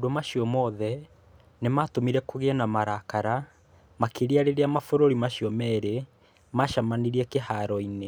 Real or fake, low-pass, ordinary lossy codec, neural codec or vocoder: real; none; none; none